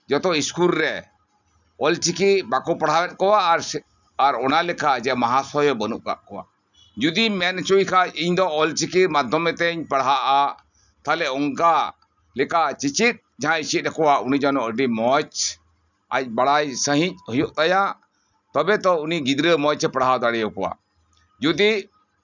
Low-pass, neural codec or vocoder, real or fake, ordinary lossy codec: 7.2 kHz; none; real; none